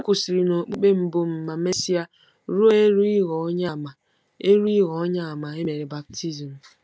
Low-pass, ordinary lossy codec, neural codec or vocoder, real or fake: none; none; none; real